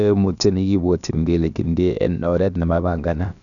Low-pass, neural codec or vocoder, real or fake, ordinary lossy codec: 7.2 kHz; codec, 16 kHz, about 1 kbps, DyCAST, with the encoder's durations; fake; none